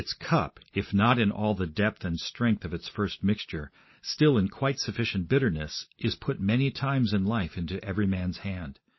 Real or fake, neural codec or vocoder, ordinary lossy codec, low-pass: real; none; MP3, 24 kbps; 7.2 kHz